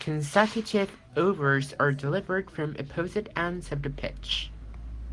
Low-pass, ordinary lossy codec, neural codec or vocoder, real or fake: 10.8 kHz; Opus, 24 kbps; none; real